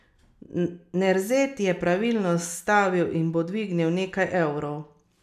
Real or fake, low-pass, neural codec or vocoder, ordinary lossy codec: real; 14.4 kHz; none; none